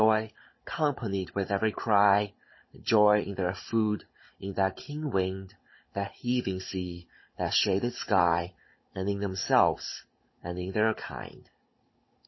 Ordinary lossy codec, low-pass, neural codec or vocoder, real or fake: MP3, 24 kbps; 7.2 kHz; codec, 16 kHz, 16 kbps, FunCodec, trained on Chinese and English, 50 frames a second; fake